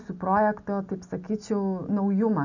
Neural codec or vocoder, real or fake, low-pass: none; real; 7.2 kHz